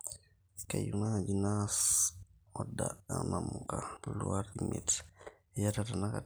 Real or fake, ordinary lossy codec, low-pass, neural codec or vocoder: real; none; none; none